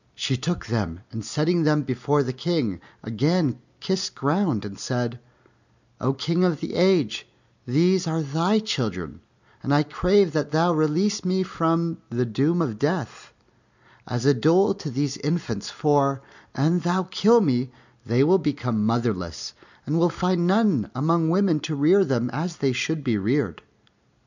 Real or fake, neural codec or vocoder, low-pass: real; none; 7.2 kHz